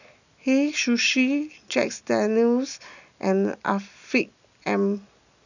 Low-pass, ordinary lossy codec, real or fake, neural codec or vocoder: 7.2 kHz; none; real; none